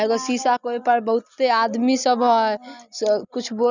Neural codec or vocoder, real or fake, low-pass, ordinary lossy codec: none; real; 7.2 kHz; none